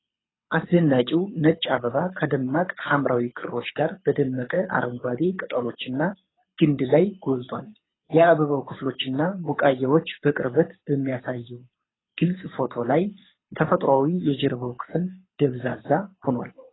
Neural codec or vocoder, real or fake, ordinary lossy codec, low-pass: codec, 24 kHz, 6 kbps, HILCodec; fake; AAC, 16 kbps; 7.2 kHz